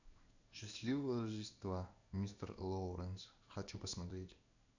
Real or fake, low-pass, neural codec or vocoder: fake; 7.2 kHz; codec, 16 kHz in and 24 kHz out, 1 kbps, XY-Tokenizer